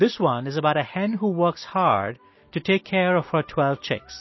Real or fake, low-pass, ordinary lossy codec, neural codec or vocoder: real; 7.2 kHz; MP3, 24 kbps; none